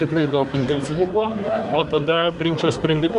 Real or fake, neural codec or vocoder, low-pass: fake; codec, 24 kHz, 1 kbps, SNAC; 10.8 kHz